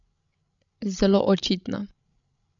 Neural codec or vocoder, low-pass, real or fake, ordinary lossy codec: codec, 16 kHz, 16 kbps, FreqCodec, larger model; 7.2 kHz; fake; none